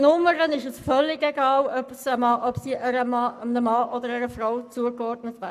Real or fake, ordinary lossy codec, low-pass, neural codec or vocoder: fake; none; 14.4 kHz; codec, 44.1 kHz, 7.8 kbps, Pupu-Codec